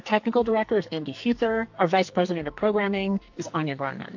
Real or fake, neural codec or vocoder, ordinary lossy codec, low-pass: fake; codec, 44.1 kHz, 2.6 kbps, SNAC; AAC, 48 kbps; 7.2 kHz